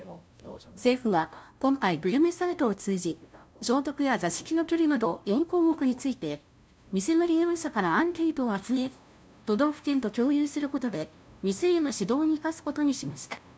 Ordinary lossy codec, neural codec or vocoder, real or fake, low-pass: none; codec, 16 kHz, 0.5 kbps, FunCodec, trained on LibriTTS, 25 frames a second; fake; none